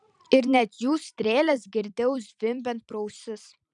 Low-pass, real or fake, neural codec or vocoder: 10.8 kHz; fake; vocoder, 44.1 kHz, 128 mel bands every 256 samples, BigVGAN v2